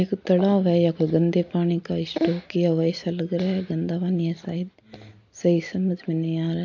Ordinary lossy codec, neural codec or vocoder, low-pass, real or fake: MP3, 48 kbps; none; 7.2 kHz; real